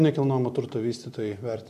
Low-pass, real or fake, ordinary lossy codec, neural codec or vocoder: 14.4 kHz; real; MP3, 96 kbps; none